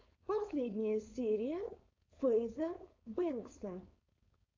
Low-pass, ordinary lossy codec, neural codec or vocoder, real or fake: 7.2 kHz; AAC, 48 kbps; codec, 16 kHz, 4.8 kbps, FACodec; fake